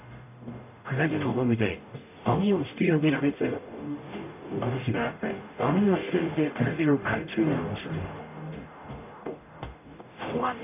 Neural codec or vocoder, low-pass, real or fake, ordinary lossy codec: codec, 44.1 kHz, 0.9 kbps, DAC; 3.6 kHz; fake; none